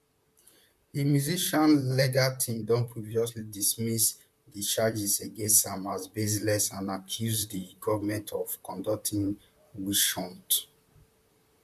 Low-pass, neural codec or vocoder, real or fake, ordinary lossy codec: 14.4 kHz; vocoder, 44.1 kHz, 128 mel bands, Pupu-Vocoder; fake; MP3, 96 kbps